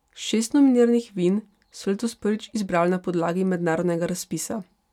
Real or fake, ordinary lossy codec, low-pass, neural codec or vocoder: real; none; 19.8 kHz; none